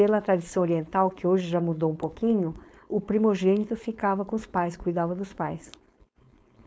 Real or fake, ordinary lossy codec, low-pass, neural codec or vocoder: fake; none; none; codec, 16 kHz, 4.8 kbps, FACodec